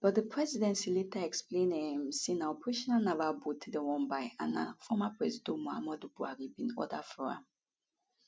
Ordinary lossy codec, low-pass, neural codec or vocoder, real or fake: none; none; none; real